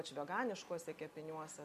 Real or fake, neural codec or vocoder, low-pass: real; none; 14.4 kHz